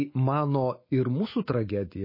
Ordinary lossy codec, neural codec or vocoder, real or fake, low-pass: MP3, 24 kbps; autoencoder, 48 kHz, 128 numbers a frame, DAC-VAE, trained on Japanese speech; fake; 5.4 kHz